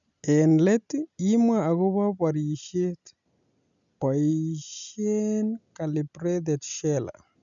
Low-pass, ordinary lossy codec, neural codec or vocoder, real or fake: 7.2 kHz; none; none; real